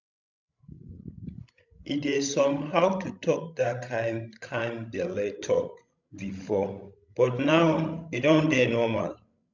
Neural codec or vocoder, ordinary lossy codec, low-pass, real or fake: codec, 16 kHz, 16 kbps, FreqCodec, larger model; none; 7.2 kHz; fake